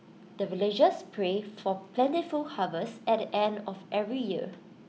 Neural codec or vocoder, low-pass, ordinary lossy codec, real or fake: none; none; none; real